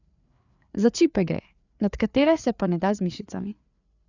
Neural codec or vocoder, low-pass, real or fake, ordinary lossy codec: codec, 16 kHz, 4 kbps, FreqCodec, larger model; 7.2 kHz; fake; none